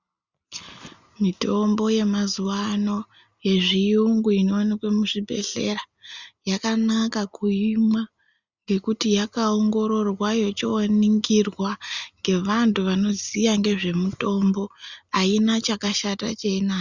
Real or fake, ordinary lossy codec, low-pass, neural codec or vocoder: real; Opus, 64 kbps; 7.2 kHz; none